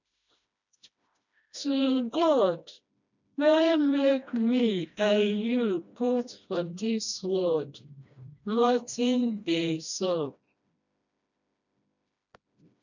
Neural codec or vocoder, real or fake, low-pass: codec, 16 kHz, 1 kbps, FreqCodec, smaller model; fake; 7.2 kHz